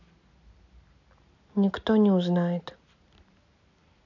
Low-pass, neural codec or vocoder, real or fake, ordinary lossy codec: 7.2 kHz; none; real; AAC, 48 kbps